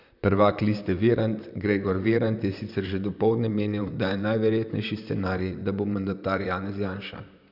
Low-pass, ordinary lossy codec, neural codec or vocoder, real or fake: 5.4 kHz; none; vocoder, 44.1 kHz, 128 mel bands, Pupu-Vocoder; fake